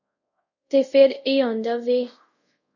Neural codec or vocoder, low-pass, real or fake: codec, 24 kHz, 0.5 kbps, DualCodec; 7.2 kHz; fake